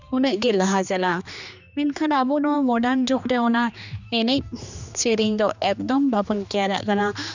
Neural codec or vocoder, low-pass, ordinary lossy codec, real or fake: codec, 16 kHz, 2 kbps, X-Codec, HuBERT features, trained on general audio; 7.2 kHz; none; fake